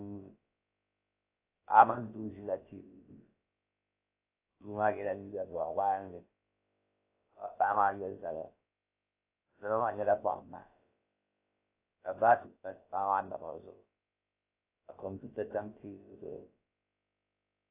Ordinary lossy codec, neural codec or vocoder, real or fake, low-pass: AAC, 24 kbps; codec, 16 kHz, about 1 kbps, DyCAST, with the encoder's durations; fake; 3.6 kHz